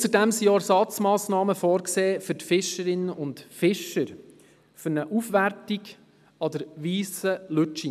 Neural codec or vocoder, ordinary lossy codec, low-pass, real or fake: none; none; 14.4 kHz; real